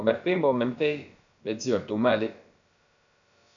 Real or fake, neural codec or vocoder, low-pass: fake; codec, 16 kHz, about 1 kbps, DyCAST, with the encoder's durations; 7.2 kHz